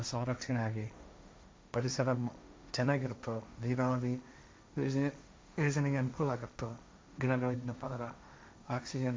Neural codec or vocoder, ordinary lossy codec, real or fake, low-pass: codec, 16 kHz, 1.1 kbps, Voila-Tokenizer; none; fake; none